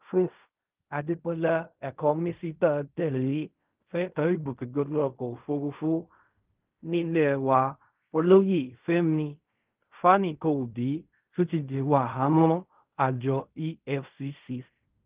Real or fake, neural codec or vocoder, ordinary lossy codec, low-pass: fake; codec, 16 kHz in and 24 kHz out, 0.4 kbps, LongCat-Audio-Codec, fine tuned four codebook decoder; Opus, 24 kbps; 3.6 kHz